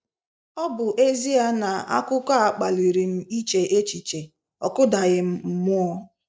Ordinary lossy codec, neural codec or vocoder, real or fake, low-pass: none; none; real; none